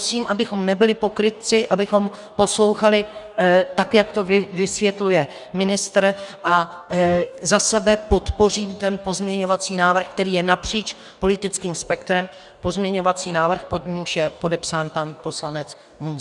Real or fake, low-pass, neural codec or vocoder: fake; 10.8 kHz; codec, 44.1 kHz, 2.6 kbps, DAC